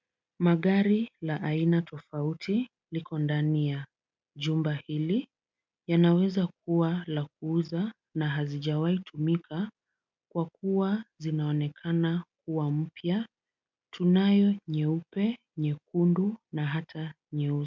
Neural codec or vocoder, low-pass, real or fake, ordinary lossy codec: none; 7.2 kHz; real; MP3, 64 kbps